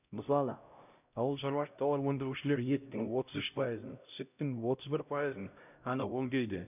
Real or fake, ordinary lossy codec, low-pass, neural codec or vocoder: fake; none; 3.6 kHz; codec, 16 kHz, 0.5 kbps, X-Codec, HuBERT features, trained on LibriSpeech